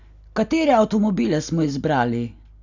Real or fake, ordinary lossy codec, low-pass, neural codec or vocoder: real; none; 7.2 kHz; none